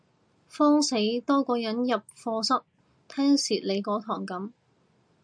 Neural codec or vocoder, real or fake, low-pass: none; real; 9.9 kHz